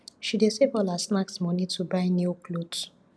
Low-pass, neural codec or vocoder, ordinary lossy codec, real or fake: none; none; none; real